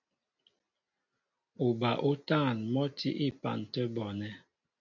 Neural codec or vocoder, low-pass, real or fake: none; 7.2 kHz; real